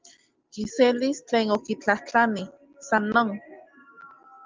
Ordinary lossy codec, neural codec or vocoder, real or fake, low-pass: Opus, 24 kbps; none; real; 7.2 kHz